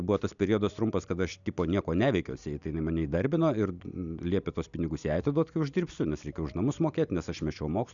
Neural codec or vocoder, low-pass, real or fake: none; 7.2 kHz; real